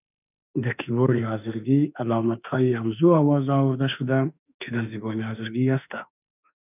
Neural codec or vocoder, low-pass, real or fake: autoencoder, 48 kHz, 32 numbers a frame, DAC-VAE, trained on Japanese speech; 3.6 kHz; fake